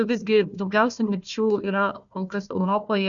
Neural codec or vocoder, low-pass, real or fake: codec, 16 kHz, 1 kbps, FunCodec, trained on Chinese and English, 50 frames a second; 7.2 kHz; fake